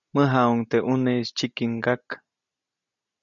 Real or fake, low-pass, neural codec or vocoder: real; 7.2 kHz; none